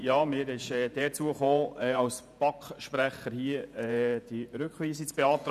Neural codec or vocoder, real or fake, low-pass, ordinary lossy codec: vocoder, 48 kHz, 128 mel bands, Vocos; fake; 14.4 kHz; none